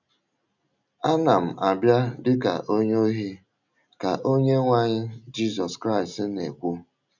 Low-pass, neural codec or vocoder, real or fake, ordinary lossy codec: 7.2 kHz; none; real; none